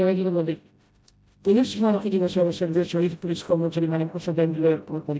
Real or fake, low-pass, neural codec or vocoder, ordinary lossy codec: fake; none; codec, 16 kHz, 0.5 kbps, FreqCodec, smaller model; none